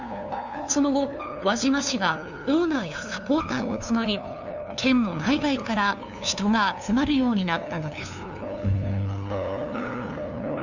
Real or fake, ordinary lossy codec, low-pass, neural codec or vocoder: fake; none; 7.2 kHz; codec, 16 kHz, 2 kbps, FunCodec, trained on LibriTTS, 25 frames a second